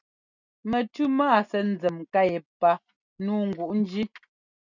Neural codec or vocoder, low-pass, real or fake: none; 7.2 kHz; real